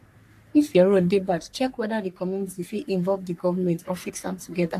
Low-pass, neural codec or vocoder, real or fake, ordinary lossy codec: 14.4 kHz; codec, 44.1 kHz, 3.4 kbps, Pupu-Codec; fake; AAC, 64 kbps